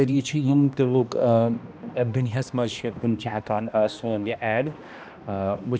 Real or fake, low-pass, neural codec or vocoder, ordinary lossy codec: fake; none; codec, 16 kHz, 1 kbps, X-Codec, HuBERT features, trained on balanced general audio; none